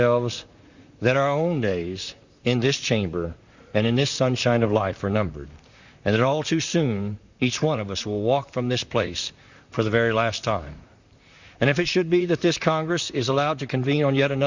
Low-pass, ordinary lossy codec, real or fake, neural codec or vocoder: 7.2 kHz; Opus, 64 kbps; fake; vocoder, 44.1 kHz, 128 mel bands, Pupu-Vocoder